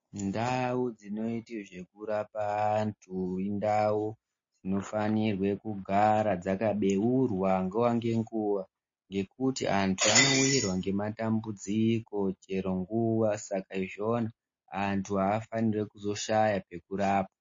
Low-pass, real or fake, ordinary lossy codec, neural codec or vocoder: 7.2 kHz; real; MP3, 32 kbps; none